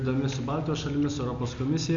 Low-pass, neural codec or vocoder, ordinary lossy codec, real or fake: 7.2 kHz; none; MP3, 48 kbps; real